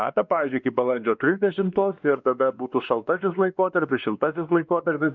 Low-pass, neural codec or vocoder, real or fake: 7.2 kHz; codec, 16 kHz, 2 kbps, X-Codec, WavLM features, trained on Multilingual LibriSpeech; fake